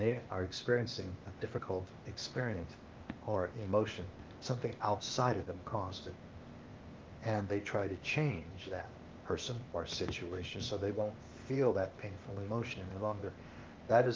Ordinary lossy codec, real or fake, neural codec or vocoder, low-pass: Opus, 32 kbps; fake; codec, 16 kHz, 0.8 kbps, ZipCodec; 7.2 kHz